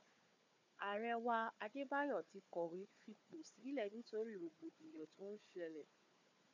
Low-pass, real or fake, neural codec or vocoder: 7.2 kHz; fake; codec, 16 kHz, 4 kbps, FunCodec, trained on Chinese and English, 50 frames a second